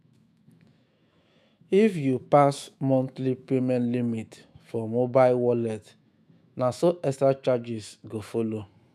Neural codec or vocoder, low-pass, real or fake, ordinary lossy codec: autoencoder, 48 kHz, 128 numbers a frame, DAC-VAE, trained on Japanese speech; 14.4 kHz; fake; none